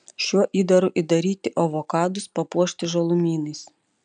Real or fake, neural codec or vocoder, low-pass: real; none; 9.9 kHz